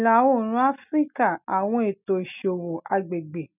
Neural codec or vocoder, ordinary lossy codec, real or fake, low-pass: none; none; real; 3.6 kHz